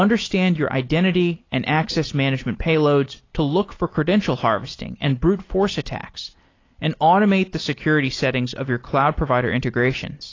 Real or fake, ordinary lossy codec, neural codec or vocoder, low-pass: real; AAC, 32 kbps; none; 7.2 kHz